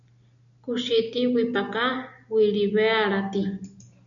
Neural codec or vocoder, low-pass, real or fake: none; 7.2 kHz; real